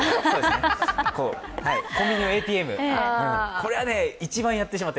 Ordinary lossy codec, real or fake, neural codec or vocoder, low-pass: none; real; none; none